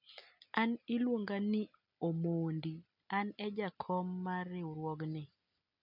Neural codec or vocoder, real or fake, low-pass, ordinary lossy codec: none; real; 5.4 kHz; none